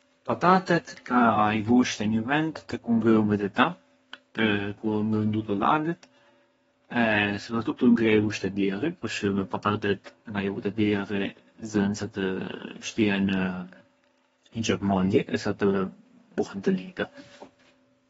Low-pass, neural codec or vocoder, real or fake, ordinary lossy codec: 14.4 kHz; codec, 32 kHz, 1.9 kbps, SNAC; fake; AAC, 24 kbps